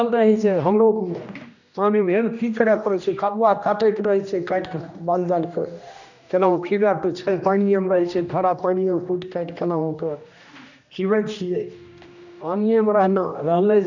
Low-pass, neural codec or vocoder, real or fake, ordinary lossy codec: 7.2 kHz; codec, 16 kHz, 1 kbps, X-Codec, HuBERT features, trained on general audio; fake; none